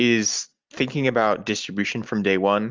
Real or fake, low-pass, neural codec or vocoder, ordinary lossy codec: real; 7.2 kHz; none; Opus, 24 kbps